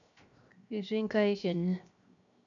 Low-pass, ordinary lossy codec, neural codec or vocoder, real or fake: 7.2 kHz; none; codec, 16 kHz, 0.7 kbps, FocalCodec; fake